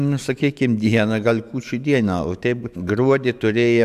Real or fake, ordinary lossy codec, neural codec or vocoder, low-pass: fake; AAC, 96 kbps; autoencoder, 48 kHz, 128 numbers a frame, DAC-VAE, trained on Japanese speech; 14.4 kHz